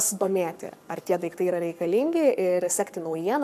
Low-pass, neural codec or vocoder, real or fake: 14.4 kHz; codec, 44.1 kHz, 7.8 kbps, Pupu-Codec; fake